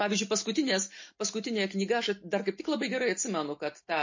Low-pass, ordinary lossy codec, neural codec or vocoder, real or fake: 7.2 kHz; MP3, 32 kbps; none; real